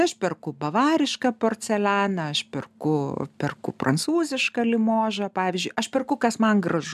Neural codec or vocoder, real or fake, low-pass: none; real; 14.4 kHz